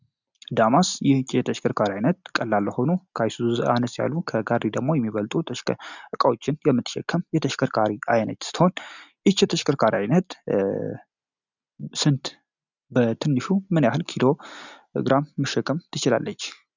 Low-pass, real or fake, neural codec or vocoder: 7.2 kHz; real; none